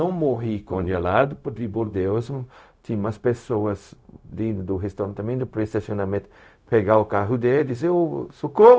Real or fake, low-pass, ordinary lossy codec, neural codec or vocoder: fake; none; none; codec, 16 kHz, 0.4 kbps, LongCat-Audio-Codec